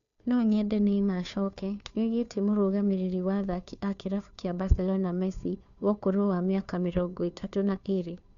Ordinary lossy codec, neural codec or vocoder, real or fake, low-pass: none; codec, 16 kHz, 2 kbps, FunCodec, trained on Chinese and English, 25 frames a second; fake; 7.2 kHz